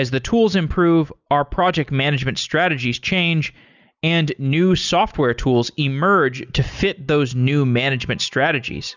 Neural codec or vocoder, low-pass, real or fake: none; 7.2 kHz; real